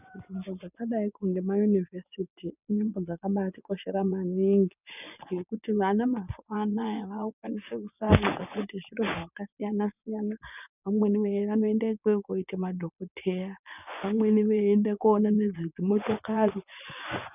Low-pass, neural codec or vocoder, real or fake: 3.6 kHz; vocoder, 24 kHz, 100 mel bands, Vocos; fake